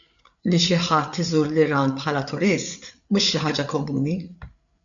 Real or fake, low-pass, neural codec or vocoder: fake; 7.2 kHz; codec, 16 kHz, 8 kbps, FreqCodec, larger model